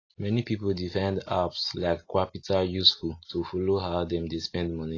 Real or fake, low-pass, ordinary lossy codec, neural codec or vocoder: real; 7.2 kHz; AAC, 32 kbps; none